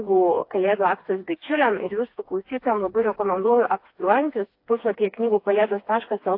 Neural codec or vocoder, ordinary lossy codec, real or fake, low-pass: codec, 16 kHz, 2 kbps, FreqCodec, smaller model; AAC, 32 kbps; fake; 5.4 kHz